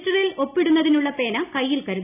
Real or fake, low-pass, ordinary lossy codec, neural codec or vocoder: real; 3.6 kHz; none; none